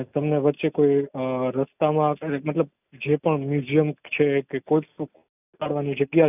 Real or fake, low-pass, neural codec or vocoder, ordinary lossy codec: real; 3.6 kHz; none; none